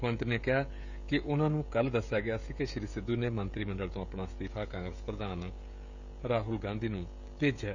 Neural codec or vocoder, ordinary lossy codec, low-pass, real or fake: codec, 16 kHz, 16 kbps, FreqCodec, smaller model; none; 7.2 kHz; fake